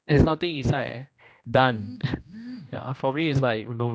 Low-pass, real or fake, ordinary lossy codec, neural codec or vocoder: none; fake; none; codec, 16 kHz, 1 kbps, X-Codec, HuBERT features, trained on general audio